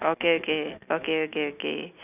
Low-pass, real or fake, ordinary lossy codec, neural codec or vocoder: 3.6 kHz; real; none; none